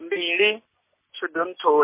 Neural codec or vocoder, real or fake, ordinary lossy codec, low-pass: none; real; MP3, 24 kbps; 3.6 kHz